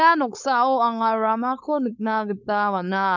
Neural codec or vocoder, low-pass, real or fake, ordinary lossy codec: codec, 16 kHz, 4.8 kbps, FACodec; 7.2 kHz; fake; none